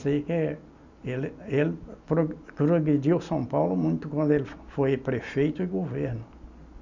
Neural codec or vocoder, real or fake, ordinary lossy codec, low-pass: none; real; none; 7.2 kHz